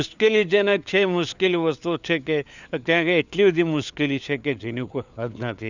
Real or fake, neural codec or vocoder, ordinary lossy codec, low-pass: fake; codec, 16 kHz, 4 kbps, FunCodec, trained on LibriTTS, 50 frames a second; none; 7.2 kHz